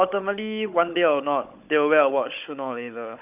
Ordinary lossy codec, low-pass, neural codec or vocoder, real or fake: none; 3.6 kHz; codec, 16 kHz, 16 kbps, FunCodec, trained on Chinese and English, 50 frames a second; fake